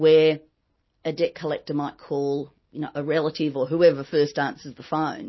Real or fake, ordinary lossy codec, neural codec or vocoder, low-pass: real; MP3, 24 kbps; none; 7.2 kHz